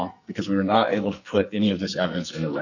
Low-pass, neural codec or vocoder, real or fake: 7.2 kHz; codec, 44.1 kHz, 2.6 kbps, SNAC; fake